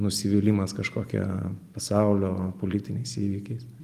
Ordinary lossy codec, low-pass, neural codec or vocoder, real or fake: Opus, 32 kbps; 14.4 kHz; none; real